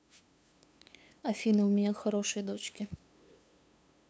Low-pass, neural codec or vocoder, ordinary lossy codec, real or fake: none; codec, 16 kHz, 2 kbps, FunCodec, trained on LibriTTS, 25 frames a second; none; fake